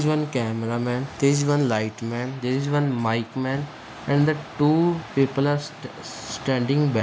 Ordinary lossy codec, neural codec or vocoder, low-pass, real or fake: none; none; none; real